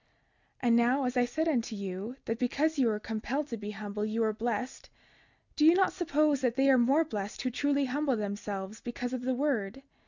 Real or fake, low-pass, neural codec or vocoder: real; 7.2 kHz; none